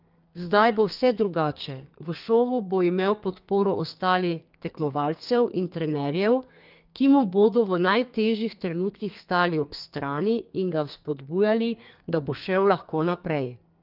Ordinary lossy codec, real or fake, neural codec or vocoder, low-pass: Opus, 24 kbps; fake; codec, 32 kHz, 1.9 kbps, SNAC; 5.4 kHz